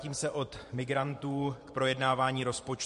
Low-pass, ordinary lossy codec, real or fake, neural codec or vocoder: 14.4 kHz; MP3, 48 kbps; fake; vocoder, 44.1 kHz, 128 mel bands every 512 samples, BigVGAN v2